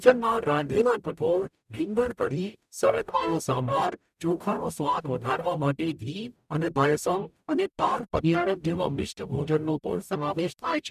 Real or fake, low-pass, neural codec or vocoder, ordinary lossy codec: fake; 14.4 kHz; codec, 44.1 kHz, 0.9 kbps, DAC; none